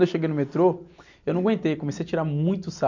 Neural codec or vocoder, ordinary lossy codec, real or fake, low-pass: none; none; real; 7.2 kHz